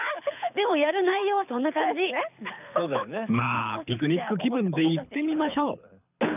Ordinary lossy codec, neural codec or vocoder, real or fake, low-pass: none; codec, 24 kHz, 6 kbps, HILCodec; fake; 3.6 kHz